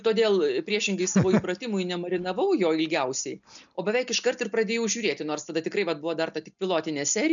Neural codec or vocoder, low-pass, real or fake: none; 7.2 kHz; real